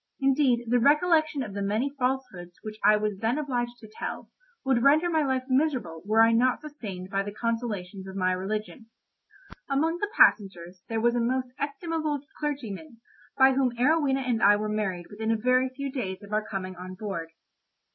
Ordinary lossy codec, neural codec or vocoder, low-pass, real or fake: MP3, 24 kbps; none; 7.2 kHz; real